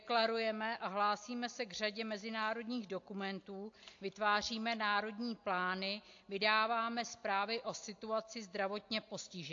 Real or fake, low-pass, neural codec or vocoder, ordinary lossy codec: real; 7.2 kHz; none; AAC, 48 kbps